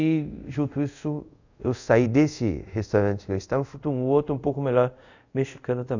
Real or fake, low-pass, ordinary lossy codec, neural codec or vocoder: fake; 7.2 kHz; none; codec, 24 kHz, 0.5 kbps, DualCodec